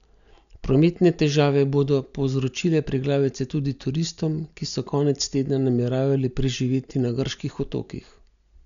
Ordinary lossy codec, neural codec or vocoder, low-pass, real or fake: none; none; 7.2 kHz; real